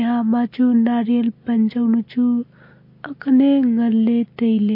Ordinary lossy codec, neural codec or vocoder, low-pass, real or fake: MP3, 32 kbps; none; 5.4 kHz; real